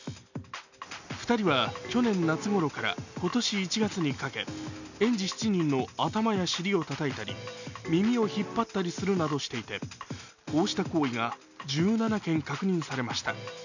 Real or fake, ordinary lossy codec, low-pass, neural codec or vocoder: real; none; 7.2 kHz; none